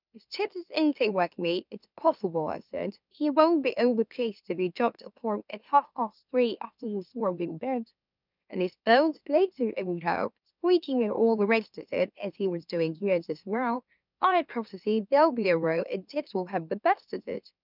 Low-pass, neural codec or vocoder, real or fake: 5.4 kHz; autoencoder, 44.1 kHz, a latent of 192 numbers a frame, MeloTTS; fake